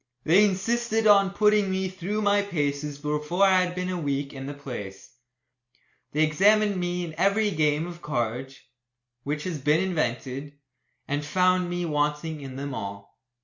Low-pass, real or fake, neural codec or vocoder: 7.2 kHz; real; none